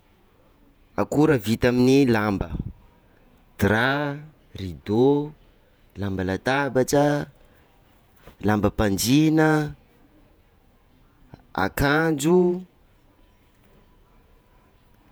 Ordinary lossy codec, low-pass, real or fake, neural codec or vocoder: none; none; fake; vocoder, 48 kHz, 128 mel bands, Vocos